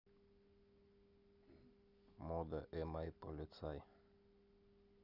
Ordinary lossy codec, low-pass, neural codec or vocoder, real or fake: none; 5.4 kHz; none; real